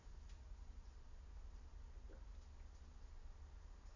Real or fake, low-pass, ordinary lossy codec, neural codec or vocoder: real; 7.2 kHz; none; none